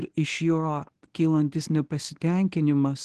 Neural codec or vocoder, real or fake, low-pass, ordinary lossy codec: codec, 24 kHz, 0.9 kbps, WavTokenizer, medium speech release version 1; fake; 10.8 kHz; Opus, 16 kbps